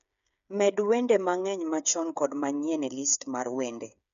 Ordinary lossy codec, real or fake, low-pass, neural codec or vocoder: none; fake; 7.2 kHz; codec, 16 kHz, 8 kbps, FreqCodec, smaller model